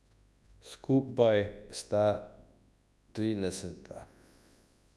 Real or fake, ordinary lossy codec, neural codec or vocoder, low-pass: fake; none; codec, 24 kHz, 0.9 kbps, WavTokenizer, large speech release; none